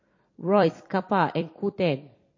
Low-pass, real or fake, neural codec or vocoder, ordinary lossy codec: 7.2 kHz; fake; vocoder, 44.1 kHz, 128 mel bands every 256 samples, BigVGAN v2; MP3, 32 kbps